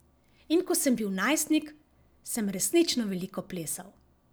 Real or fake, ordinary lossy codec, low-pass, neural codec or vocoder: real; none; none; none